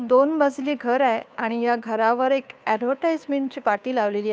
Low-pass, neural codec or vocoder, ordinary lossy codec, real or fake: none; codec, 16 kHz, 2 kbps, FunCodec, trained on Chinese and English, 25 frames a second; none; fake